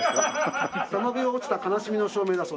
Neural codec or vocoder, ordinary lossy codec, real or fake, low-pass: none; none; real; none